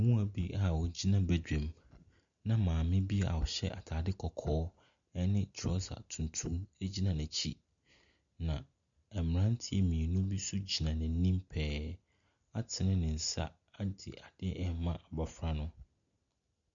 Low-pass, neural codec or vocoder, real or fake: 7.2 kHz; none; real